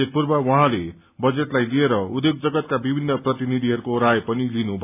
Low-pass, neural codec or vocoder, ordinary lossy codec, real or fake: 3.6 kHz; none; AAC, 32 kbps; real